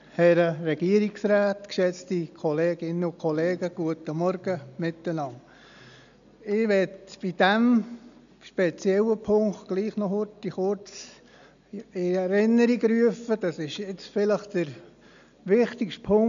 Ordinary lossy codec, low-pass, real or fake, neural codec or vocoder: none; 7.2 kHz; real; none